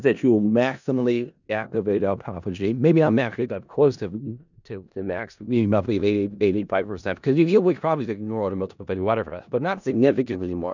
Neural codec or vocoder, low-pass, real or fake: codec, 16 kHz in and 24 kHz out, 0.4 kbps, LongCat-Audio-Codec, four codebook decoder; 7.2 kHz; fake